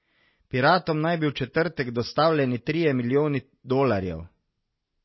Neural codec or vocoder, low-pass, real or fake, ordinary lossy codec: none; 7.2 kHz; real; MP3, 24 kbps